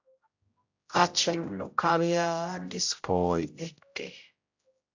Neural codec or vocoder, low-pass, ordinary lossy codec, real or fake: codec, 16 kHz, 0.5 kbps, X-Codec, HuBERT features, trained on general audio; 7.2 kHz; AAC, 48 kbps; fake